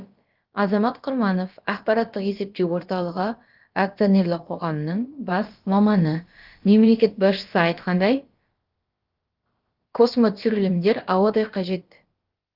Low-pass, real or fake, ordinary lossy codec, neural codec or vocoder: 5.4 kHz; fake; Opus, 32 kbps; codec, 16 kHz, about 1 kbps, DyCAST, with the encoder's durations